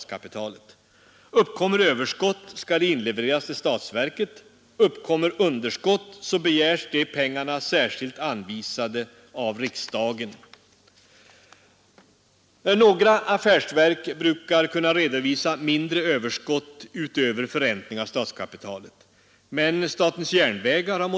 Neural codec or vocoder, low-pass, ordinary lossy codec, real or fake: none; none; none; real